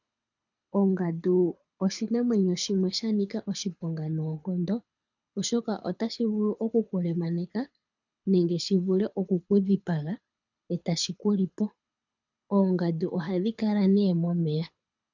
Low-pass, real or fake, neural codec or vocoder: 7.2 kHz; fake; codec, 24 kHz, 6 kbps, HILCodec